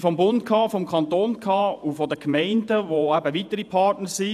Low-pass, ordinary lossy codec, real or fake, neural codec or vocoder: 14.4 kHz; none; fake; vocoder, 48 kHz, 128 mel bands, Vocos